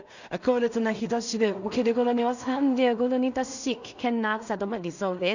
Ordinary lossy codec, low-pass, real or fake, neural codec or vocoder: none; 7.2 kHz; fake; codec, 16 kHz in and 24 kHz out, 0.4 kbps, LongCat-Audio-Codec, two codebook decoder